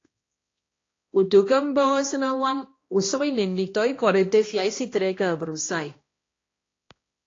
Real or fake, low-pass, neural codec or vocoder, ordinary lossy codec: fake; 7.2 kHz; codec, 16 kHz, 1 kbps, X-Codec, HuBERT features, trained on balanced general audio; AAC, 32 kbps